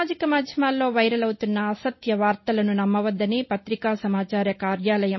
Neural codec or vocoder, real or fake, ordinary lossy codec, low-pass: none; real; MP3, 24 kbps; 7.2 kHz